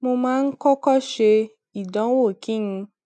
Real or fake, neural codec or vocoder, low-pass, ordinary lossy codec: real; none; none; none